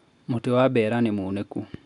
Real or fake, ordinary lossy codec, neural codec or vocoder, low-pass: real; none; none; 10.8 kHz